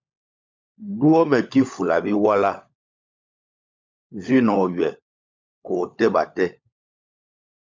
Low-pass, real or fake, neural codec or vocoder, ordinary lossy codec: 7.2 kHz; fake; codec, 16 kHz, 16 kbps, FunCodec, trained on LibriTTS, 50 frames a second; MP3, 64 kbps